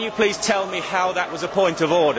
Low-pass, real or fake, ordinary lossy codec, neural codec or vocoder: none; real; none; none